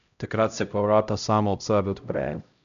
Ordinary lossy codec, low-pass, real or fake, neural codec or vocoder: none; 7.2 kHz; fake; codec, 16 kHz, 0.5 kbps, X-Codec, HuBERT features, trained on LibriSpeech